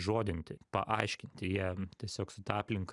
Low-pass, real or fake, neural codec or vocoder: 10.8 kHz; real; none